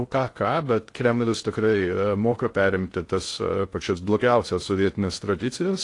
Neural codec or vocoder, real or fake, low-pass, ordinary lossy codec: codec, 16 kHz in and 24 kHz out, 0.6 kbps, FocalCodec, streaming, 2048 codes; fake; 10.8 kHz; AAC, 48 kbps